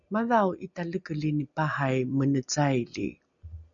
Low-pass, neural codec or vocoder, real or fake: 7.2 kHz; none; real